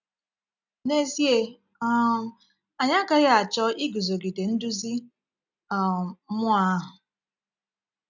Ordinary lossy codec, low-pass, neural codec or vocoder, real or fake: none; 7.2 kHz; none; real